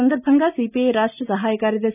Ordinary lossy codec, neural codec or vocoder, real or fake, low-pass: none; none; real; 3.6 kHz